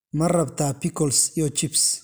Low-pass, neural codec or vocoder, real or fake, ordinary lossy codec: none; none; real; none